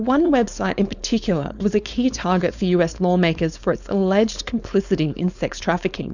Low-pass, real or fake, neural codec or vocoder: 7.2 kHz; fake; codec, 16 kHz, 4.8 kbps, FACodec